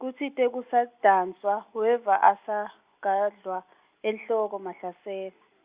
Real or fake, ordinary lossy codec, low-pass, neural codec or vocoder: real; Opus, 64 kbps; 3.6 kHz; none